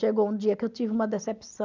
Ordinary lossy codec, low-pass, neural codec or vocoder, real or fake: none; 7.2 kHz; none; real